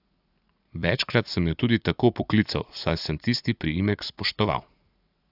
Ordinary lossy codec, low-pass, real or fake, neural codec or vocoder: AAC, 48 kbps; 5.4 kHz; fake; vocoder, 44.1 kHz, 80 mel bands, Vocos